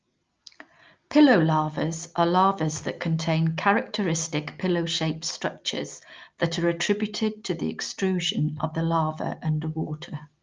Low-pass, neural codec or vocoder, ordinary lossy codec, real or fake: 7.2 kHz; none; Opus, 24 kbps; real